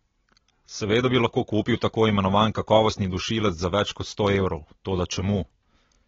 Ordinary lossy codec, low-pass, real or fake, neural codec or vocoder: AAC, 24 kbps; 7.2 kHz; real; none